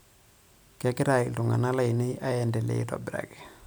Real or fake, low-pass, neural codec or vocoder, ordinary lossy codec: real; none; none; none